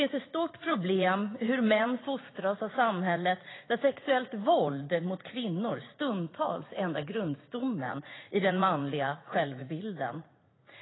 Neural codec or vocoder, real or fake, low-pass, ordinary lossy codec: vocoder, 44.1 kHz, 80 mel bands, Vocos; fake; 7.2 kHz; AAC, 16 kbps